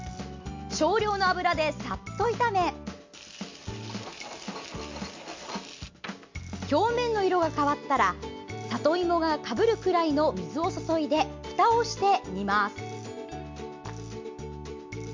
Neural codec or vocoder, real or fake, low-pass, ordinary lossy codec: none; real; 7.2 kHz; MP3, 48 kbps